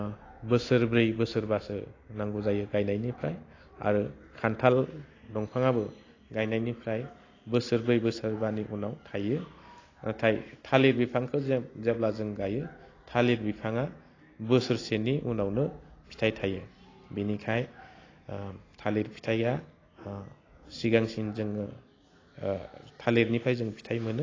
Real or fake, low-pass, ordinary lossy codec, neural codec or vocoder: real; 7.2 kHz; AAC, 32 kbps; none